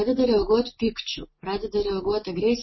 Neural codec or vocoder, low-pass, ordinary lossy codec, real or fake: none; 7.2 kHz; MP3, 24 kbps; real